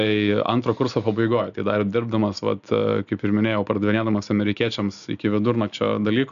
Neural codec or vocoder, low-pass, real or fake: none; 7.2 kHz; real